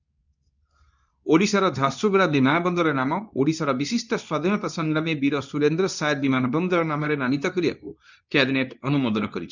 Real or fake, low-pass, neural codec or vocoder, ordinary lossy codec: fake; 7.2 kHz; codec, 24 kHz, 0.9 kbps, WavTokenizer, medium speech release version 2; none